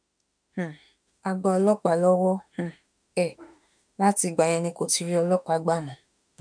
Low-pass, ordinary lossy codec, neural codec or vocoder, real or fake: 9.9 kHz; none; autoencoder, 48 kHz, 32 numbers a frame, DAC-VAE, trained on Japanese speech; fake